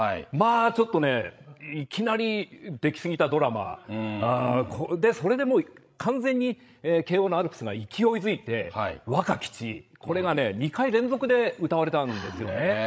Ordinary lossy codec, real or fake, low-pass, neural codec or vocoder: none; fake; none; codec, 16 kHz, 16 kbps, FreqCodec, larger model